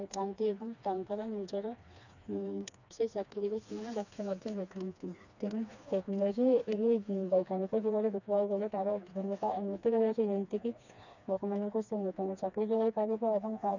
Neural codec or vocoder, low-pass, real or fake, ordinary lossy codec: codec, 16 kHz, 2 kbps, FreqCodec, smaller model; 7.2 kHz; fake; none